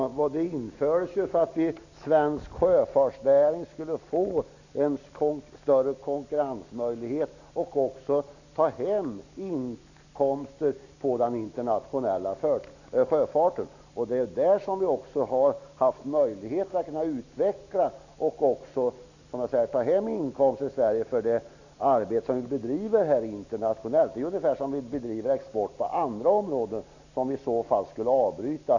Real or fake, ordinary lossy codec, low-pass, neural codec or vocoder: real; none; 7.2 kHz; none